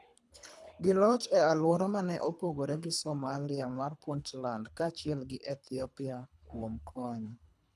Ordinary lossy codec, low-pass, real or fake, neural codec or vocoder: none; none; fake; codec, 24 kHz, 3 kbps, HILCodec